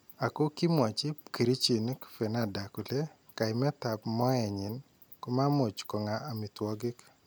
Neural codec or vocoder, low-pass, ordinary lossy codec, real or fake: none; none; none; real